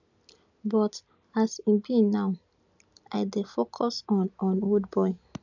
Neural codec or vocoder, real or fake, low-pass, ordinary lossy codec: none; real; 7.2 kHz; none